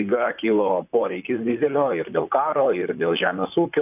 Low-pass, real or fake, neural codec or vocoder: 3.6 kHz; fake; vocoder, 44.1 kHz, 128 mel bands, Pupu-Vocoder